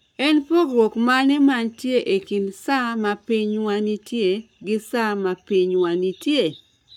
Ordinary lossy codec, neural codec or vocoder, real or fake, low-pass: none; codec, 44.1 kHz, 7.8 kbps, Pupu-Codec; fake; 19.8 kHz